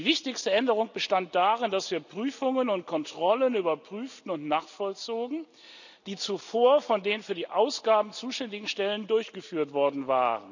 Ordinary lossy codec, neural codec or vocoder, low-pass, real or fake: none; none; 7.2 kHz; real